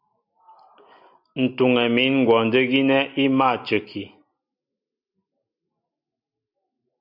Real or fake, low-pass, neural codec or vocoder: real; 5.4 kHz; none